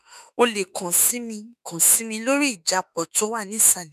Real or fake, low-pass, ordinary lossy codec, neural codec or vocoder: fake; 14.4 kHz; none; autoencoder, 48 kHz, 32 numbers a frame, DAC-VAE, trained on Japanese speech